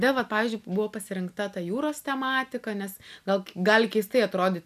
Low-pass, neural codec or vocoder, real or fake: 14.4 kHz; none; real